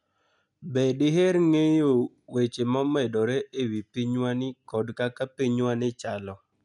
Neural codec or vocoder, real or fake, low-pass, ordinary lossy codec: none; real; 10.8 kHz; none